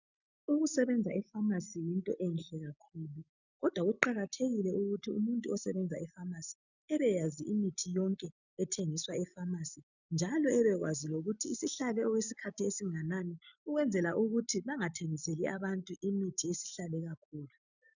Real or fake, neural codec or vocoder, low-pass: real; none; 7.2 kHz